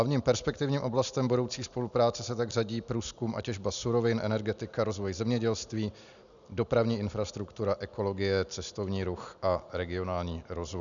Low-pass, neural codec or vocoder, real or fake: 7.2 kHz; none; real